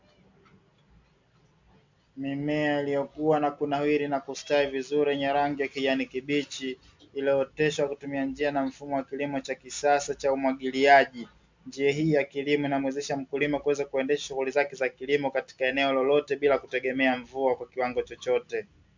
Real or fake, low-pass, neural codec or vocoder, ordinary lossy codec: real; 7.2 kHz; none; MP3, 64 kbps